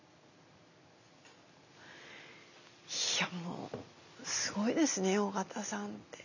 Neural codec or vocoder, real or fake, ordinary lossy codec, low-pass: none; real; none; 7.2 kHz